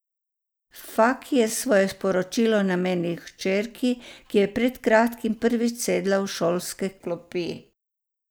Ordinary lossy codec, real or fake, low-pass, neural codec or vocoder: none; real; none; none